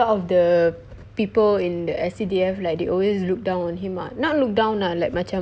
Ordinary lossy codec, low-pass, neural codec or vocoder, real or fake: none; none; none; real